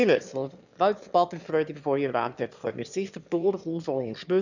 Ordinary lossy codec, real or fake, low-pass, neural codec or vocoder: none; fake; 7.2 kHz; autoencoder, 22.05 kHz, a latent of 192 numbers a frame, VITS, trained on one speaker